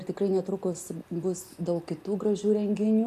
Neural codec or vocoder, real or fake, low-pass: none; real; 14.4 kHz